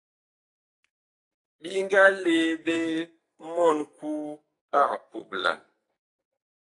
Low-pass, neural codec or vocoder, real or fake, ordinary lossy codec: 10.8 kHz; codec, 44.1 kHz, 2.6 kbps, SNAC; fake; AAC, 48 kbps